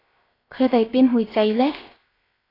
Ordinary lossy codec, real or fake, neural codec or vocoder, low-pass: AAC, 24 kbps; fake; codec, 16 kHz, 0.7 kbps, FocalCodec; 5.4 kHz